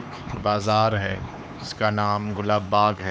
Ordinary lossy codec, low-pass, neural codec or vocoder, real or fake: none; none; codec, 16 kHz, 4 kbps, X-Codec, HuBERT features, trained on LibriSpeech; fake